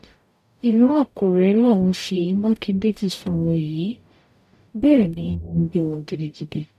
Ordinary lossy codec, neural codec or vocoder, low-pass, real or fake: none; codec, 44.1 kHz, 0.9 kbps, DAC; 14.4 kHz; fake